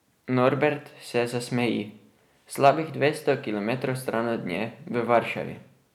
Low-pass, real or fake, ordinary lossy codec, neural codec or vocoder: 19.8 kHz; real; none; none